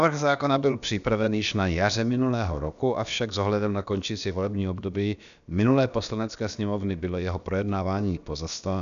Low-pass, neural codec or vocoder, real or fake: 7.2 kHz; codec, 16 kHz, about 1 kbps, DyCAST, with the encoder's durations; fake